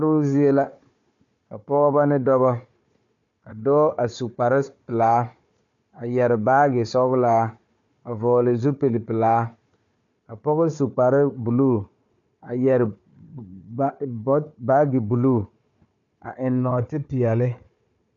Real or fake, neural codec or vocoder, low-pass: fake; codec, 16 kHz, 4 kbps, FunCodec, trained on Chinese and English, 50 frames a second; 7.2 kHz